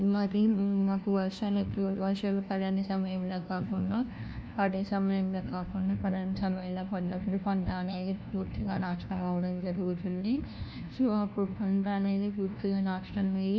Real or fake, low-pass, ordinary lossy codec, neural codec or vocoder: fake; none; none; codec, 16 kHz, 1 kbps, FunCodec, trained on LibriTTS, 50 frames a second